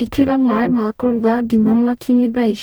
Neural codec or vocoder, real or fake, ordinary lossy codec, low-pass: codec, 44.1 kHz, 0.9 kbps, DAC; fake; none; none